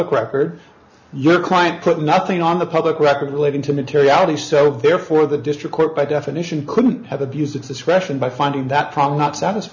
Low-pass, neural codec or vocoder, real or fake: 7.2 kHz; none; real